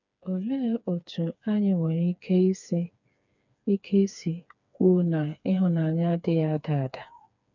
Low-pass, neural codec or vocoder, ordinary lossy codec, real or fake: 7.2 kHz; codec, 16 kHz, 4 kbps, FreqCodec, smaller model; none; fake